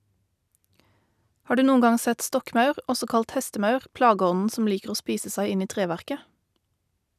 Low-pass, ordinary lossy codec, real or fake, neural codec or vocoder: 14.4 kHz; none; real; none